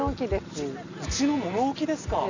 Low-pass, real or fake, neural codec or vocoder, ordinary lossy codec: 7.2 kHz; real; none; Opus, 64 kbps